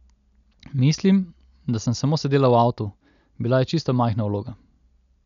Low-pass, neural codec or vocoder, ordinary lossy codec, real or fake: 7.2 kHz; none; none; real